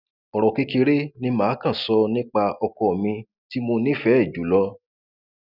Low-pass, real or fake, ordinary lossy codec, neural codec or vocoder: 5.4 kHz; real; none; none